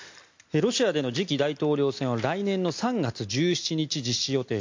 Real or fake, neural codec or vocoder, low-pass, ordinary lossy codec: real; none; 7.2 kHz; MP3, 48 kbps